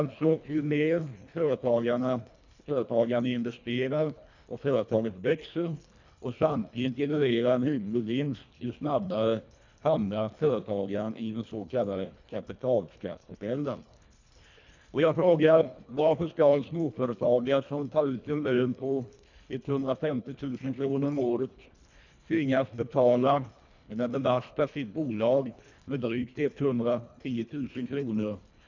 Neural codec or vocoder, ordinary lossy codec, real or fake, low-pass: codec, 24 kHz, 1.5 kbps, HILCodec; none; fake; 7.2 kHz